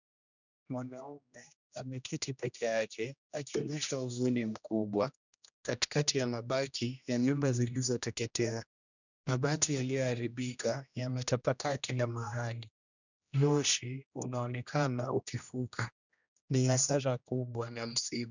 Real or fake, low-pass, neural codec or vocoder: fake; 7.2 kHz; codec, 16 kHz, 1 kbps, X-Codec, HuBERT features, trained on general audio